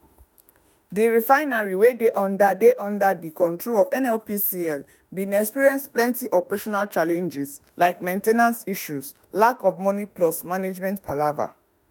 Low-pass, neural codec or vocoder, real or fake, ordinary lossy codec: none; autoencoder, 48 kHz, 32 numbers a frame, DAC-VAE, trained on Japanese speech; fake; none